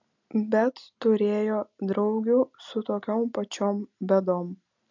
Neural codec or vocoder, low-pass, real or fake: none; 7.2 kHz; real